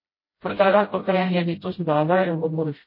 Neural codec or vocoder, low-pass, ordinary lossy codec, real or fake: codec, 16 kHz, 0.5 kbps, FreqCodec, smaller model; 5.4 kHz; MP3, 24 kbps; fake